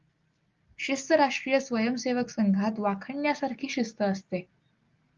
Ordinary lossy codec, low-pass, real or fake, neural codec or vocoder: Opus, 32 kbps; 7.2 kHz; real; none